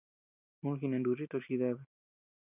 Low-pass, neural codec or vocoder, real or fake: 3.6 kHz; none; real